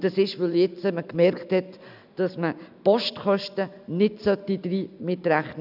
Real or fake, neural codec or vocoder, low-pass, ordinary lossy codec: real; none; 5.4 kHz; none